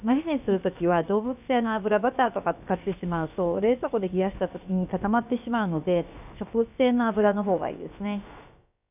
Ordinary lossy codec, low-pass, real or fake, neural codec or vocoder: none; 3.6 kHz; fake; codec, 16 kHz, about 1 kbps, DyCAST, with the encoder's durations